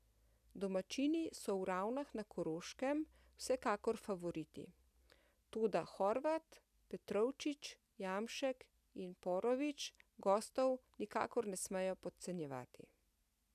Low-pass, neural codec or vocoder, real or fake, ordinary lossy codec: 14.4 kHz; none; real; none